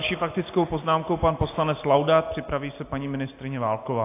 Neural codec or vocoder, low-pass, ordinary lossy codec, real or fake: none; 3.6 kHz; AAC, 24 kbps; real